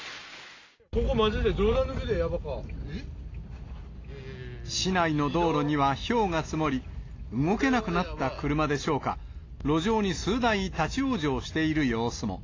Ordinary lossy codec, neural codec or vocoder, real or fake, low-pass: AAC, 32 kbps; none; real; 7.2 kHz